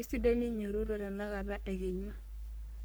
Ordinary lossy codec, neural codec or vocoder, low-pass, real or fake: none; codec, 44.1 kHz, 3.4 kbps, Pupu-Codec; none; fake